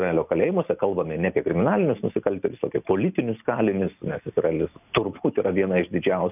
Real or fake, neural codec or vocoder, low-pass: real; none; 3.6 kHz